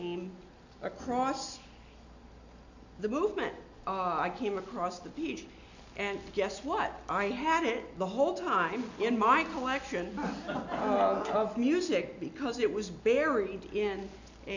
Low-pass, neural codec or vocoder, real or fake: 7.2 kHz; none; real